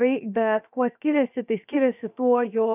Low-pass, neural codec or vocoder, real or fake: 3.6 kHz; codec, 16 kHz, about 1 kbps, DyCAST, with the encoder's durations; fake